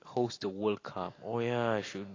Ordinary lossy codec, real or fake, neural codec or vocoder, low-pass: AAC, 32 kbps; real; none; 7.2 kHz